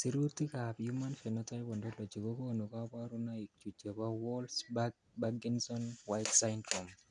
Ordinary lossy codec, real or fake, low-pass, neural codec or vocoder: none; real; 9.9 kHz; none